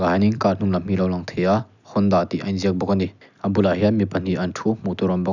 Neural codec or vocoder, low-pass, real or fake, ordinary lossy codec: none; 7.2 kHz; real; none